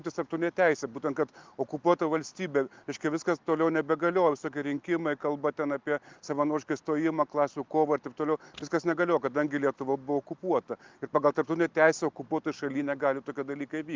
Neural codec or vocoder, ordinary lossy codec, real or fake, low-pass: none; Opus, 32 kbps; real; 7.2 kHz